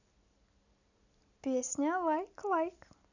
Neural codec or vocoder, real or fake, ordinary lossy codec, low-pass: none; real; none; 7.2 kHz